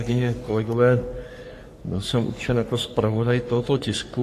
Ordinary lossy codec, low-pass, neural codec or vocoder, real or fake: AAC, 64 kbps; 14.4 kHz; codec, 44.1 kHz, 3.4 kbps, Pupu-Codec; fake